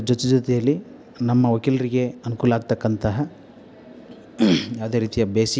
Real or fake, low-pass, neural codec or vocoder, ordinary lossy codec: real; none; none; none